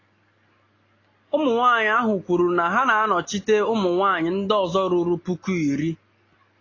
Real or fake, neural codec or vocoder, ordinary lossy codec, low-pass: real; none; AAC, 32 kbps; 7.2 kHz